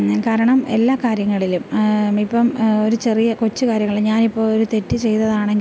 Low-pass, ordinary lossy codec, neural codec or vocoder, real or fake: none; none; none; real